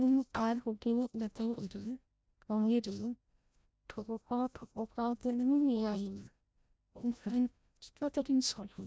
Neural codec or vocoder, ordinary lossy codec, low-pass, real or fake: codec, 16 kHz, 0.5 kbps, FreqCodec, larger model; none; none; fake